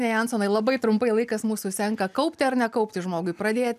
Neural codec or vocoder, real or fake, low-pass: none; real; 14.4 kHz